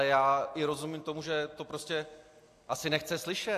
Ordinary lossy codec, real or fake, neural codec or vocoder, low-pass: AAC, 64 kbps; real; none; 14.4 kHz